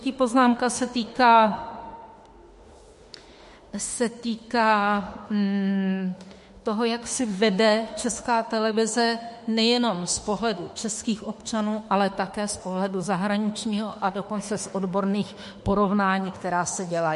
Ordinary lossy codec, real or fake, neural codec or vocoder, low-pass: MP3, 48 kbps; fake; autoencoder, 48 kHz, 32 numbers a frame, DAC-VAE, trained on Japanese speech; 14.4 kHz